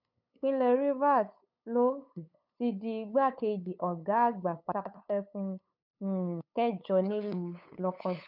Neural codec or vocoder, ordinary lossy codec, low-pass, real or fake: codec, 16 kHz, 8 kbps, FunCodec, trained on LibriTTS, 25 frames a second; none; 5.4 kHz; fake